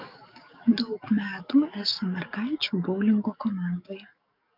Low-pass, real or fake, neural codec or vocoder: 5.4 kHz; fake; codec, 44.1 kHz, 7.8 kbps, DAC